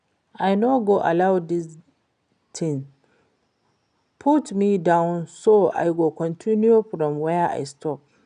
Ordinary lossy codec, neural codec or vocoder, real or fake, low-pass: none; none; real; 9.9 kHz